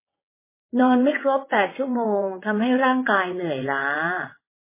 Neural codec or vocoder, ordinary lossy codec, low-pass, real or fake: vocoder, 22.05 kHz, 80 mel bands, WaveNeXt; MP3, 16 kbps; 3.6 kHz; fake